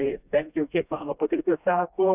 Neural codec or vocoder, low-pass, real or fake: codec, 16 kHz, 1 kbps, FreqCodec, smaller model; 3.6 kHz; fake